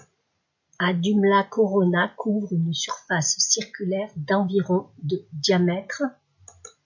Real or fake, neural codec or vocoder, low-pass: real; none; 7.2 kHz